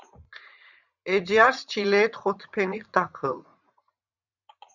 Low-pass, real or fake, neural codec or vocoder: 7.2 kHz; fake; vocoder, 44.1 kHz, 80 mel bands, Vocos